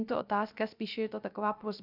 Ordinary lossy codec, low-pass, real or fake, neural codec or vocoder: Opus, 64 kbps; 5.4 kHz; fake; codec, 16 kHz, 0.3 kbps, FocalCodec